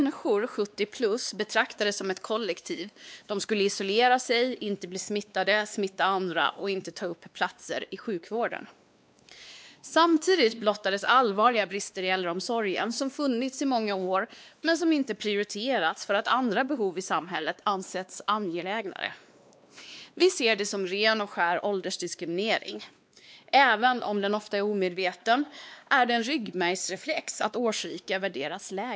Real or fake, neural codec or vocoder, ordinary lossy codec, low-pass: fake; codec, 16 kHz, 2 kbps, X-Codec, WavLM features, trained on Multilingual LibriSpeech; none; none